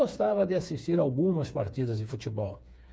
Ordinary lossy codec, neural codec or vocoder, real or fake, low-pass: none; codec, 16 kHz, 4 kbps, FreqCodec, smaller model; fake; none